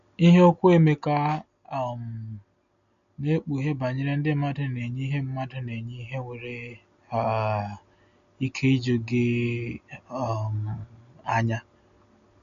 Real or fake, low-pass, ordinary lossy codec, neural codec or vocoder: real; 7.2 kHz; none; none